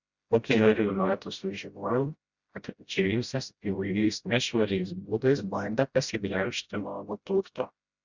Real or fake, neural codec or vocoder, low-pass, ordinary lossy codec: fake; codec, 16 kHz, 0.5 kbps, FreqCodec, smaller model; 7.2 kHz; Opus, 64 kbps